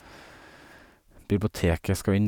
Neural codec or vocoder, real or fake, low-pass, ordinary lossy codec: none; real; 19.8 kHz; none